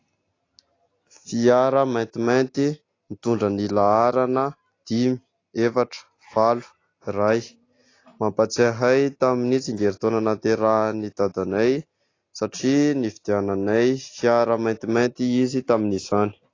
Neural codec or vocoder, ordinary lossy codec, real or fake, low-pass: none; AAC, 32 kbps; real; 7.2 kHz